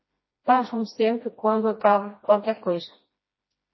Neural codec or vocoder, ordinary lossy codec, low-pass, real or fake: codec, 16 kHz, 1 kbps, FreqCodec, smaller model; MP3, 24 kbps; 7.2 kHz; fake